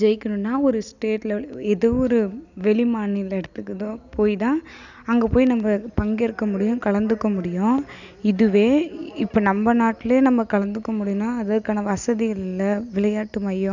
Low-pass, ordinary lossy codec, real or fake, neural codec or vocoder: 7.2 kHz; none; real; none